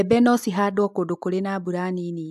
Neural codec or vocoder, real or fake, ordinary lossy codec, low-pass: none; real; AAC, 96 kbps; 14.4 kHz